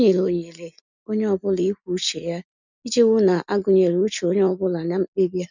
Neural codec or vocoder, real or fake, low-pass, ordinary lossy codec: none; real; 7.2 kHz; none